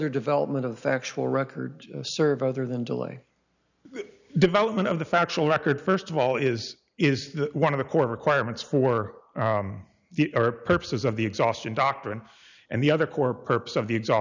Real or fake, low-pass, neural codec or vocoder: real; 7.2 kHz; none